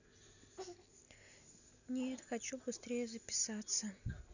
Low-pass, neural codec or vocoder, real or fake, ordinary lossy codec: 7.2 kHz; none; real; none